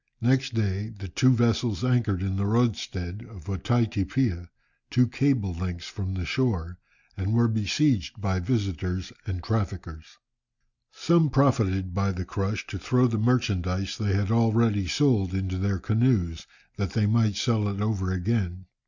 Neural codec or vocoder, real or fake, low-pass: none; real; 7.2 kHz